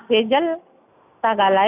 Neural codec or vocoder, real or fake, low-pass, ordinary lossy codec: none; real; 3.6 kHz; none